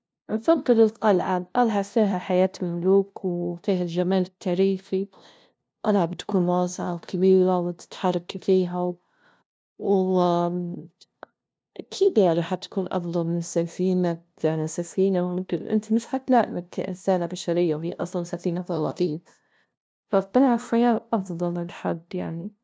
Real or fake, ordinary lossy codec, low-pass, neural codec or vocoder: fake; none; none; codec, 16 kHz, 0.5 kbps, FunCodec, trained on LibriTTS, 25 frames a second